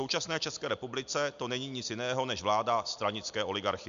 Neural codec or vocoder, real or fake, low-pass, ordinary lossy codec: none; real; 7.2 kHz; MP3, 64 kbps